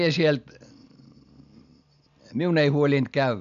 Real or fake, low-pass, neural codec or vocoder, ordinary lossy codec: real; 7.2 kHz; none; none